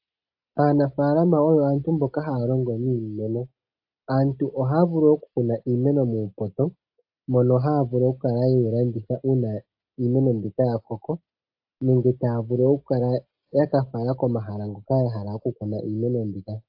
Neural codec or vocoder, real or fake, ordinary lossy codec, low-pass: none; real; AAC, 48 kbps; 5.4 kHz